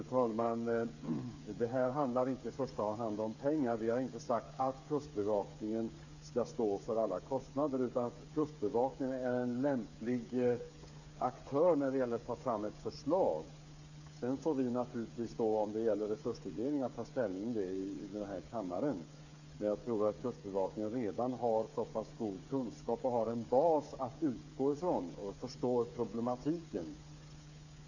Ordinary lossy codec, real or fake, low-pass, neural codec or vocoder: none; fake; 7.2 kHz; codec, 16 kHz, 8 kbps, FreqCodec, smaller model